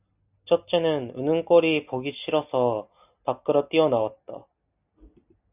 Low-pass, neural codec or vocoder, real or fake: 3.6 kHz; none; real